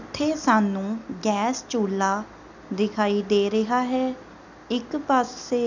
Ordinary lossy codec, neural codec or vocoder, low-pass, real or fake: none; none; 7.2 kHz; real